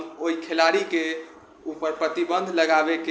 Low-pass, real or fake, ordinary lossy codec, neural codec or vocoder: none; real; none; none